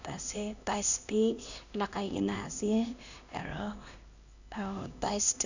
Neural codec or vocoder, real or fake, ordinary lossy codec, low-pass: codec, 16 kHz, 1 kbps, X-Codec, HuBERT features, trained on LibriSpeech; fake; none; 7.2 kHz